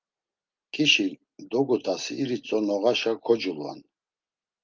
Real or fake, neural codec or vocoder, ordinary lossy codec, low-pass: real; none; Opus, 24 kbps; 7.2 kHz